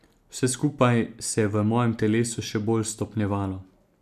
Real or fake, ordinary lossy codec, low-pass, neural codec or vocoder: real; none; 14.4 kHz; none